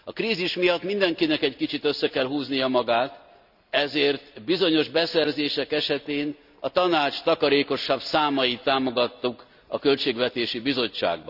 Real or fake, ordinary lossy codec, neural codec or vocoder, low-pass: real; none; none; 5.4 kHz